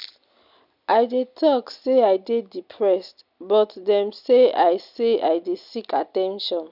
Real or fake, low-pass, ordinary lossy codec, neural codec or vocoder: real; 5.4 kHz; none; none